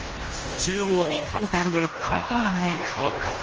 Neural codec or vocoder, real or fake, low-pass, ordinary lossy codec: codec, 16 kHz in and 24 kHz out, 0.9 kbps, LongCat-Audio-Codec, fine tuned four codebook decoder; fake; 7.2 kHz; Opus, 24 kbps